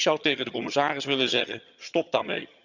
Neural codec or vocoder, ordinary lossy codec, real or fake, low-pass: vocoder, 22.05 kHz, 80 mel bands, HiFi-GAN; none; fake; 7.2 kHz